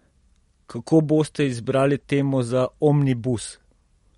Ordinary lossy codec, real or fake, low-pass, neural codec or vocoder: MP3, 48 kbps; real; 19.8 kHz; none